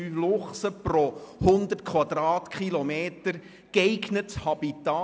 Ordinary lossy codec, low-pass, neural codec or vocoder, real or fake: none; none; none; real